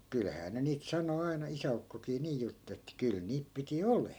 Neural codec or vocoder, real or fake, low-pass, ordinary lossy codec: none; real; none; none